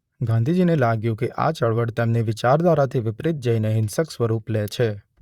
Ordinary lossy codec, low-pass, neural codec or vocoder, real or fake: Opus, 64 kbps; 19.8 kHz; vocoder, 44.1 kHz, 128 mel bands, Pupu-Vocoder; fake